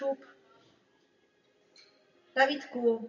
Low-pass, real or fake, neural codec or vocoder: 7.2 kHz; real; none